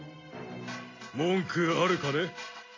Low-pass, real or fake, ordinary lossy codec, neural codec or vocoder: 7.2 kHz; real; AAC, 32 kbps; none